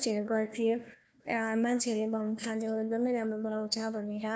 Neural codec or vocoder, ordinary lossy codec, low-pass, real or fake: codec, 16 kHz, 1 kbps, FunCodec, trained on Chinese and English, 50 frames a second; none; none; fake